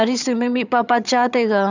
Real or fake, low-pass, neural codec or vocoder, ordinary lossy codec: fake; 7.2 kHz; vocoder, 22.05 kHz, 80 mel bands, HiFi-GAN; none